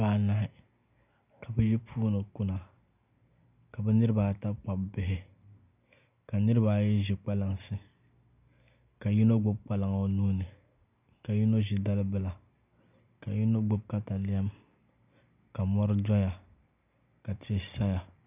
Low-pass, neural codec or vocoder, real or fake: 3.6 kHz; none; real